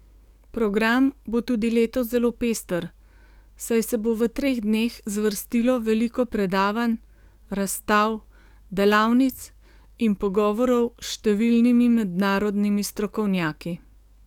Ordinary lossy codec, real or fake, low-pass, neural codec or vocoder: none; fake; 19.8 kHz; codec, 44.1 kHz, 7.8 kbps, DAC